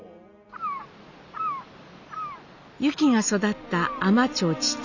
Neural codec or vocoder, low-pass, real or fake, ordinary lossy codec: none; 7.2 kHz; real; none